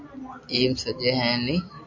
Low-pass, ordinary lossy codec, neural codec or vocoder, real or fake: 7.2 kHz; AAC, 48 kbps; none; real